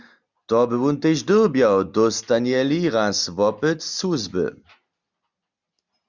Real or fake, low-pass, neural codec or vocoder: real; 7.2 kHz; none